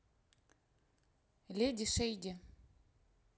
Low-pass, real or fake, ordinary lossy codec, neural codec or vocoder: none; real; none; none